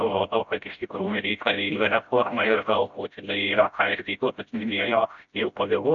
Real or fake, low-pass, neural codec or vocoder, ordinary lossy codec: fake; 7.2 kHz; codec, 16 kHz, 0.5 kbps, FreqCodec, smaller model; MP3, 64 kbps